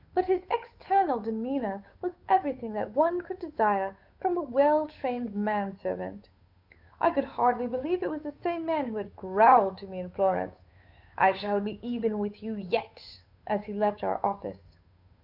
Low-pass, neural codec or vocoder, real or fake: 5.4 kHz; codec, 16 kHz, 8 kbps, FunCodec, trained on Chinese and English, 25 frames a second; fake